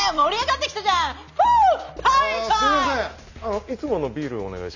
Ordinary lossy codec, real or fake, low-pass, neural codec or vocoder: none; real; 7.2 kHz; none